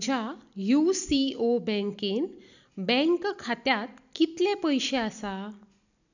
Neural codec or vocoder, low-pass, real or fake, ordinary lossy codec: none; 7.2 kHz; real; none